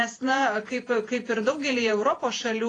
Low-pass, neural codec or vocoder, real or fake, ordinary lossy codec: 10.8 kHz; vocoder, 48 kHz, 128 mel bands, Vocos; fake; AAC, 32 kbps